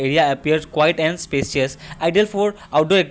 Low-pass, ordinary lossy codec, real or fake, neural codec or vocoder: none; none; real; none